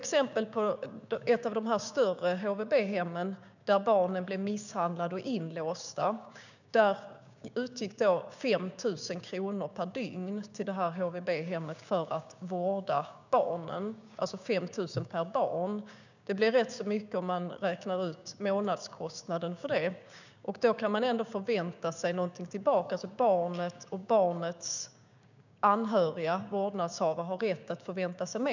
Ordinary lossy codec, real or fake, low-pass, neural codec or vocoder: none; fake; 7.2 kHz; codec, 16 kHz, 6 kbps, DAC